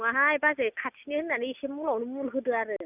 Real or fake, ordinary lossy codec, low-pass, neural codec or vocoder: real; none; 3.6 kHz; none